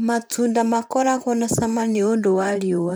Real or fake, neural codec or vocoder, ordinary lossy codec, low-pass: fake; vocoder, 44.1 kHz, 128 mel bands, Pupu-Vocoder; none; none